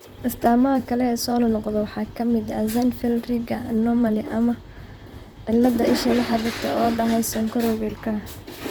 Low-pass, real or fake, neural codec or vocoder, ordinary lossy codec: none; fake; vocoder, 44.1 kHz, 128 mel bands, Pupu-Vocoder; none